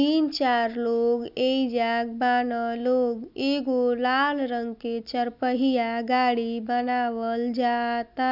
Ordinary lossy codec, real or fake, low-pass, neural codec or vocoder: none; real; 5.4 kHz; none